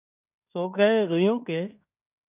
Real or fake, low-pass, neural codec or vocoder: fake; 3.6 kHz; codec, 16 kHz in and 24 kHz out, 0.9 kbps, LongCat-Audio-Codec, fine tuned four codebook decoder